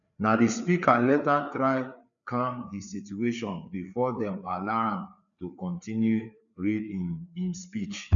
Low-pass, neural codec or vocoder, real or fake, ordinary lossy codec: 7.2 kHz; codec, 16 kHz, 4 kbps, FreqCodec, larger model; fake; none